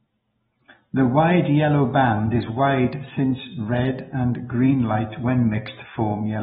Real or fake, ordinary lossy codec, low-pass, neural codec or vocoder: real; AAC, 16 kbps; 19.8 kHz; none